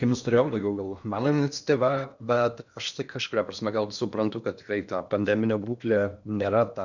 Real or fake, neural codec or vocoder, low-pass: fake; codec, 16 kHz in and 24 kHz out, 0.8 kbps, FocalCodec, streaming, 65536 codes; 7.2 kHz